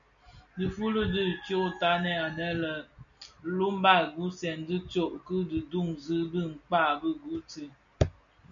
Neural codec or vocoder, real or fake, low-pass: none; real; 7.2 kHz